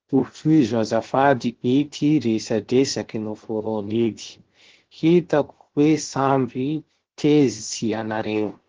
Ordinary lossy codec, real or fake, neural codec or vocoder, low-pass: Opus, 16 kbps; fake; codec, 16 kHz, 0.7 kbps, FocalCodec; 7.2 kHz